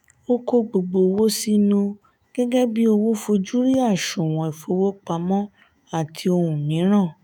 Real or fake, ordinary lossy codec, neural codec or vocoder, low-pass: fake; none; autoencoder, 48 kHz, 128 numbers a frame, DAC-VAE, trained on Japanese speech; none